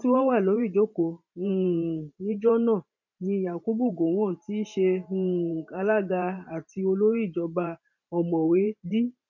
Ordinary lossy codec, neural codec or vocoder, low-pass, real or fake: none; vocoder, 44.1 kHz, 128 mel bands every 512 samples, BigVGAN v2; 7.2 kHz; fake